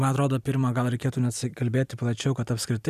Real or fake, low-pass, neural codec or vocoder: fake; 14.4 kHz; vocoder, 44.1 kHz, 128 mel bands every 256 samples, BigVGAN v2